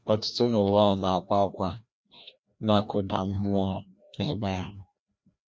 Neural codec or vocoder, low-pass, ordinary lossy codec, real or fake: codec, 16 kHz, 1 kbps, FreqCodec, larger model; none; none; fake